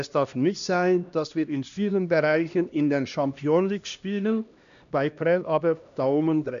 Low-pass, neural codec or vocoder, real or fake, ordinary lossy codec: 7.2 kHz; codec, 16 kHz, 1 kbps, X-Codec, HuBERT features, trained on LibriSpeech; fake; none